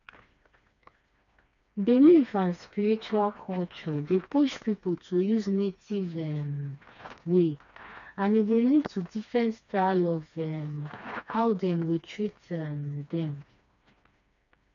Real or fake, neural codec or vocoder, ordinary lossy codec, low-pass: fake; codec, 16 kHz, 2 kbps, FreqCodec, smaller model; none; 7.2 kHz